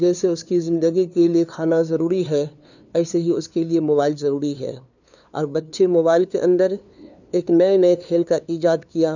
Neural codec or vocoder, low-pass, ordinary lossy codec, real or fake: codec, 16 kHz, 2 kbps, FunCodec, trained on LibriTTS, 25 frames a second; 7.2 kHz; none; fake